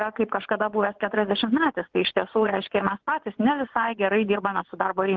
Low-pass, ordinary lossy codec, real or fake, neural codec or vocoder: 7.2 kHz; Opus, 16 kbps; fake; vocoder, 24 kHz, 100 mel bands, Vocos